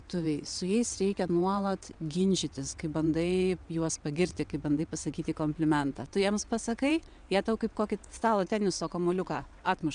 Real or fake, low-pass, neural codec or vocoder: fake; 9.9 kHz; vocoder, 22.05 kHz, 80 mel bands, WaveNeXt